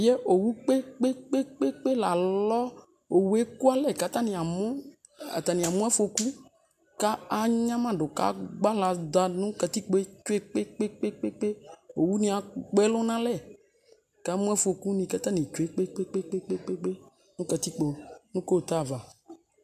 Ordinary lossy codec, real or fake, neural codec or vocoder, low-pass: AAC, 96 kbps; real; none; 14.4 kHz